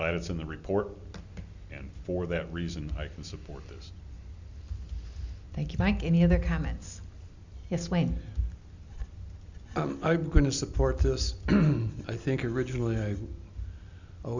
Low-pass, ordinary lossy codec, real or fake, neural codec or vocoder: 7.2 kHz; Opus, 64 kbps; real; none